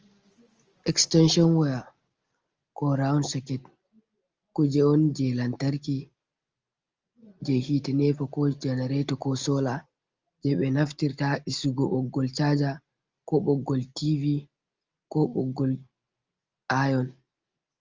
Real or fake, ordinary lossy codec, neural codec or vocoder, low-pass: real; Opus, 24 kbps; none; 7.2 kHz